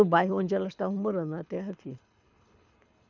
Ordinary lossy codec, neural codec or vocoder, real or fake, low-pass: none; codec, 24 kHz, 6 kbps, HILCodec; fake; 7.2 kHz